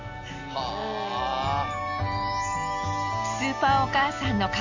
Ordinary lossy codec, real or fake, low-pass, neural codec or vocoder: AAC, 48 kbps; real; 7.2 kHz; none